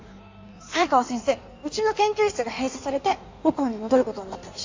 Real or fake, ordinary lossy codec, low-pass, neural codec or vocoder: fake; AAC, 48 kbps; 7.2 kHz; codec, 16 kHz in and 24 kHz out, 1.1 kbps, FireRedTTS-2 codec